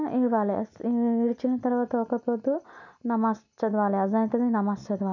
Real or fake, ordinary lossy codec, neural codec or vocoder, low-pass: real; none; none; 7.2 kHz